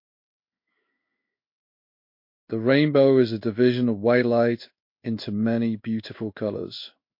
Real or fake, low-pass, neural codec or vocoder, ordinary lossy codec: fake; 5.4 kHz; codec, 16 kHz in and 24 kHz out, 1 kbps, XY-Tokenizer; MP3, 32 kbps